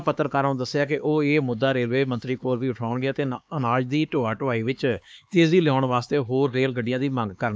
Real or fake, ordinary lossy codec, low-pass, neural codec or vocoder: fake; none; none; codec, 16 kHz, 4 kbps, X-Codec, HuBERT features, trained on LibriSpeech